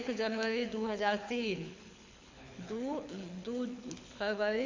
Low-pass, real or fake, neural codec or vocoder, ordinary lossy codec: 7.2 kHz; fake; codec, 24 kHz, 6 kbps, HILCodec; MP3, 48 kbps